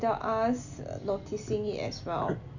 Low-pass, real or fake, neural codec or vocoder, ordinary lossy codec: 7.2 kHz; real; none; none